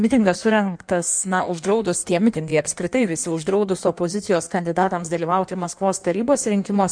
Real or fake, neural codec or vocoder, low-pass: fake; codec, 16 kHz in and 24 kHz out, 1.1 kbps, FireRedTTS-2 codec; 9.9 kHz